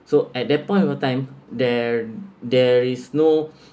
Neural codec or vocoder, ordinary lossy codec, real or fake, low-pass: none; none; real; none